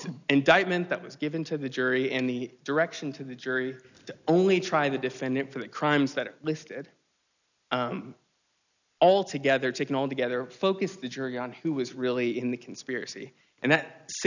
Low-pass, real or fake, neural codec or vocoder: 7.2 kHz; real; none